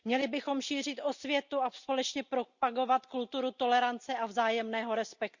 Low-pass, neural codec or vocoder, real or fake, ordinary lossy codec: 7.2 kHz; none; real; Opus, 64 kbps